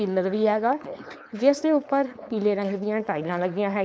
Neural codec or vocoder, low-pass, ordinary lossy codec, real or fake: codec, 16 kHz, 4.8 kbps, FACodec; none; none; fake